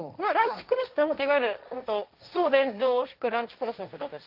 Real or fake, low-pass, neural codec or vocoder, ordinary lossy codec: fake; 5.4 kHz; codec, 16 kHz, 1.1 kbps, Voila-Tokenizer; Opus, 24 kbps